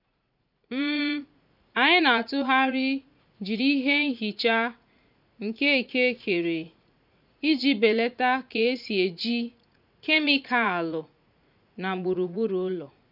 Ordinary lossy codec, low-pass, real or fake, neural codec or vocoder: none; 5.4 kHz; fake; vocoder, 44.1 kHz, 80 mel bands, Vocos